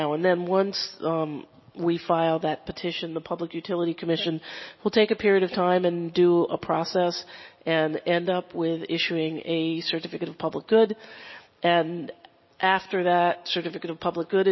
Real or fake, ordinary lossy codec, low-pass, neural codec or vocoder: real; MP3, 24 kbps; 7.2 kHz; none